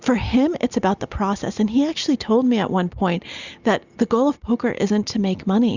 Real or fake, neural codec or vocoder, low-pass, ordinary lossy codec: real; none; 7.2 kHz; Opus, 64 kbps